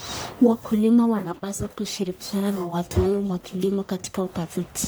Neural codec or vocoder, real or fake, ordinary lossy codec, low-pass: codec, 44.1 kHz, 1.7 kbps, Pupu-Codec; fake; none; none